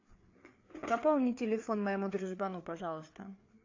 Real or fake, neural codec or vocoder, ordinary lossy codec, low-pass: fake; codec, 16 kHz, 4 kbps, FreqCodec, larger model; AAC, 48 kbps; 7.2 kHz